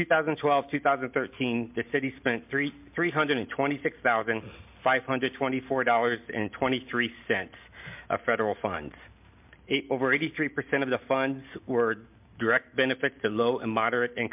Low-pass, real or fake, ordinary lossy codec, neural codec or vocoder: 3.6 kHz; real; MP3, 32 kbps; none